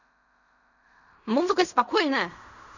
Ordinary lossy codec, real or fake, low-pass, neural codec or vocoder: none; fake; 7.2 kHz; codec, 16 kHz in and 24 kHz out, 0.4 kbps, LongCat-Audio-Codec, fine tuned four codebook decoder